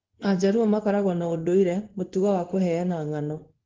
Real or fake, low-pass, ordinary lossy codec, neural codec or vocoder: fake; 7.2 kHz; Opus, 16 kbps; codec, 16 kHz in and 24 kHz out, 1 kbps, XY-Tokenizer